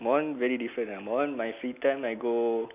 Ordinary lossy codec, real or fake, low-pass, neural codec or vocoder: none; real; 3.6 kHz; none